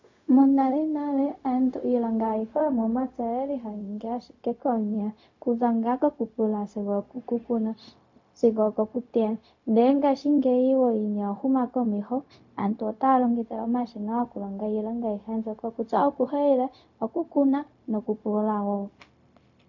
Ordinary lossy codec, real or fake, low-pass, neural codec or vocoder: MP3, 48 kbps; fake; 7.2 kHz; codec, 16 kHz, 0.4 kbps, LongCat-Audio-Codec